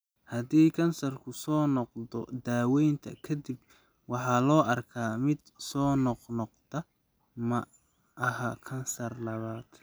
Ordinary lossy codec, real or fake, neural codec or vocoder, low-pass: none; real; none; none